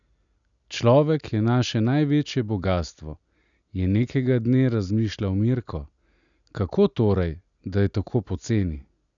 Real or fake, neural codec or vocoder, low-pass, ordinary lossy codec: real; none; 7.2 kHz; none